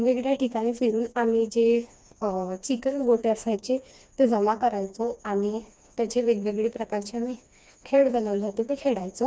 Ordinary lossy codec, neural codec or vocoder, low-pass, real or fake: none; codec, 16 kHz, 2 kbps, FreqCodec, smaller model; none; fake